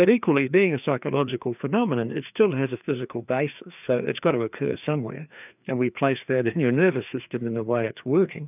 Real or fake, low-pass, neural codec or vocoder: fake; 3.6 kHz; codec, 16 kHz, 2 kbps, FreqCodec, larger model